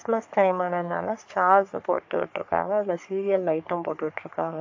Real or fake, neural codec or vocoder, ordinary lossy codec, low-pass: fake; codec, 44.1 kHz, 3.4 kbps, Pupu-Codec; AAC, 48 kbps; 7.2 kHz